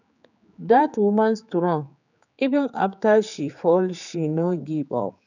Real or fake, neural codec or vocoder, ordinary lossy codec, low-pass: fake; codec, 16 kHz, 4 kbps, X-Codec, HuBERT features, trained on general audio; none; 7.2 kHz